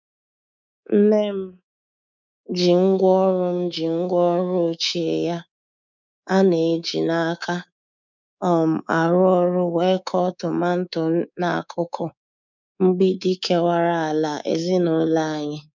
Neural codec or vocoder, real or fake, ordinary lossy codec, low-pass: codec, 24 kHz, 3.1 kbps, DualCodec; fake; none; 7.2 kHz